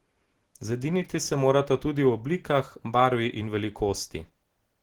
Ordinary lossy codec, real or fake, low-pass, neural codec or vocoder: Opus, 16 kbps; real; 19.8 kHz; none